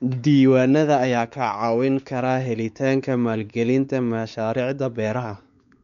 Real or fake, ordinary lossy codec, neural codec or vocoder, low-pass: fake; none; codec, 16 kHz, 4 kbps, X-Codec, WavLM features, trained on Multilingual LibriSpeech; 7.2 kHz